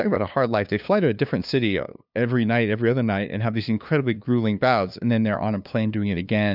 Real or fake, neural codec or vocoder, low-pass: fake; codec, 16 kHz, 2 kbps, FunCodec, trained on LibriTTS, 25 frames a second; 5.4 kHz